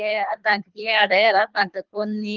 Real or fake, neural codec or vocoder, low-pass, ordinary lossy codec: fake; codec, 24 kHz, 3 kbps, HILCodec; 7.2 kHz; Opus, 24 kbps